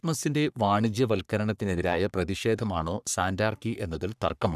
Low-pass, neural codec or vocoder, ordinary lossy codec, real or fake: 14.4 kHz; codec, 44.1 kHz, 3.4 kbps, Pupu-Codec; none; fake